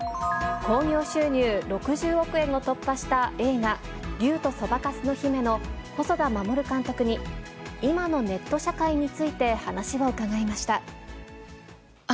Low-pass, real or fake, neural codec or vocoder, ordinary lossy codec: none; real; none; none